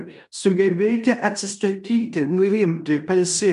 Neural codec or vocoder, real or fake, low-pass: codec, 16 kHz in and 24 kHz out, 0.9 kbps, LongCat-Audio-Codec, fine tuned four codebook decoder; fake; 10.8 kHz